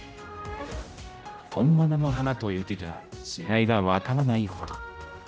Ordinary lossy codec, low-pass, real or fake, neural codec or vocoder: none; none; fake; codec, 16 kHz, 0.5 kbps, X-Codec, HuBERT features, trained on general audio